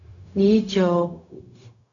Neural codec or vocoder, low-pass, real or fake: codec, 16 kHz, 0.4 kbps, LongCat-Audio-Codec; 7.2 kHz; fake